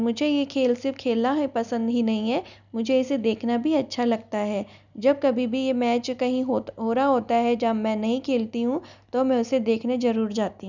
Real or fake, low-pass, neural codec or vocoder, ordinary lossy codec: real; 7.2 kHz; none; none